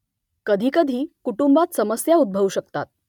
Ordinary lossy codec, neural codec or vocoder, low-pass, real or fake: none; none; 19.8 kHz; real